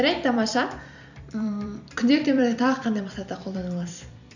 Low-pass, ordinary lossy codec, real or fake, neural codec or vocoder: 7.2 kHz; none; fake; vocoder, 44.1 kHz, 128 mel bands every 256 samples, BigVGAN v2